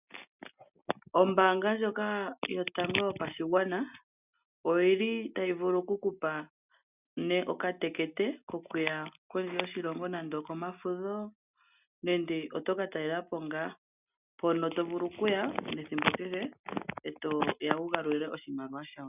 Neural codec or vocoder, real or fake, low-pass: none; real; 3.6 kHz